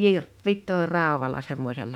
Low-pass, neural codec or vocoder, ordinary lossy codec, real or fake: 19.8 kHz; autoencoder, 48 kHz, 32 numbers a frame, DAC-VAE, trained on Japanese speech; none; fake